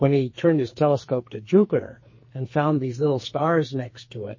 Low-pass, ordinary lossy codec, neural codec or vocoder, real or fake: 7.2 kHz; MP3, 32 kbps; codec, 44.1 kHz, 2.6 kbps, SNAC; fake